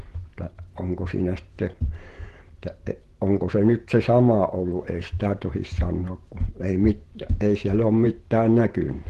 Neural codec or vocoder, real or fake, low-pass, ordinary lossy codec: vocoder, 48 kHz, 128 mel bands, Vocos; fake; 14.4 kHz; Opus, 16 kbps